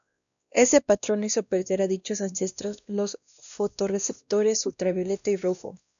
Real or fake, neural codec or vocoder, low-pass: fake; codec, 16 kHz, 1 kbps, X-Codec, WavLM features, trained on Multilingual LibriSpeech; 7.2 kHz